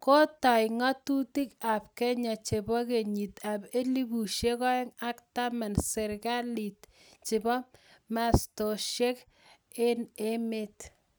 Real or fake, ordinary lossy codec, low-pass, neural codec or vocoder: real; none; none; none